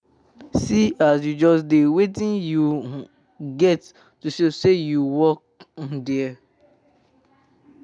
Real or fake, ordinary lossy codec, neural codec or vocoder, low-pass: real; none; none; 9.9 kHz